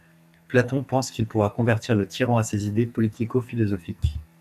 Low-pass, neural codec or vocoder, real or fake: 14.4 kHz; codec, 44.1 kHz, 2.6 kbps, SNAC; fake